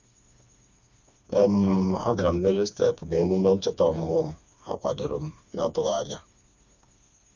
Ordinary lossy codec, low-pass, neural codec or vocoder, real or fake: none; 7.2 kHz; codec, 16 kHz, 2 kbps, FreqCodec, smaller model; fake